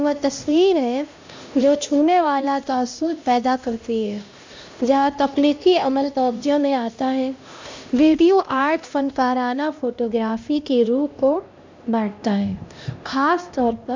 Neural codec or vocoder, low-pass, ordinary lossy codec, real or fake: codec, 16 kHz, 1 kbps, X-Codec, HuBERT features, trained on LibriSpeech; 7.2 kHz; MP3, 64 kbps; fake